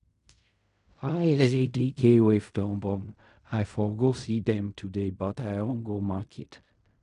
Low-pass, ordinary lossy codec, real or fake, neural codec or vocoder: 10.8 kHz; AAC, 96 kbps; fake; codec, 16 kHz in and 24 kHz out, 0.4 kbps, LongCat-Audio-Codec, fine tuned four codebook decoder